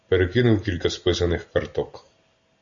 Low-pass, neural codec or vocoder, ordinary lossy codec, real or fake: 7.2 kHz; none; Opus, 64 kbps; real